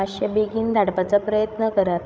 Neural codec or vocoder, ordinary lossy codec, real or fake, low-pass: codec, 16 kHz, 16 kbps, FreqCodec, larger model; none; fake; none